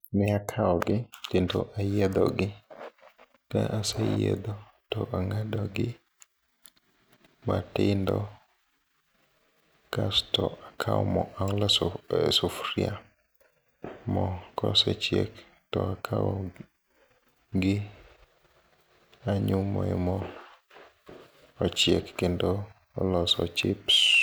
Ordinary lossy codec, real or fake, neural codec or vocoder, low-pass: none; real; none; none